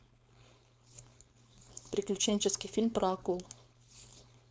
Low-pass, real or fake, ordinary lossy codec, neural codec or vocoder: none; fake; none; codec, 16 kHz, 4.8 kbps, FACodec